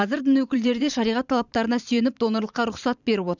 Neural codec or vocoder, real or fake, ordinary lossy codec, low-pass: vocoder, 44.1 kHz, 128 mel bands every 512 samples, BigVGAN v2; fake; none; 7.2 kHz